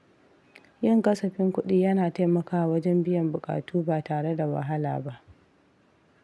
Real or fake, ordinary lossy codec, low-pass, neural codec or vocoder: real; none; none; none